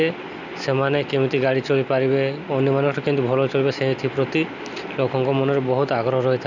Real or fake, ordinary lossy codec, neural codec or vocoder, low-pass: real; none; none; 7.2 kHz